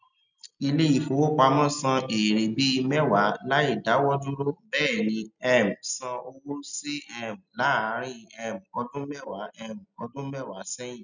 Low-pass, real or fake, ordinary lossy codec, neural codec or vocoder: 7.2 kHz; real; none; none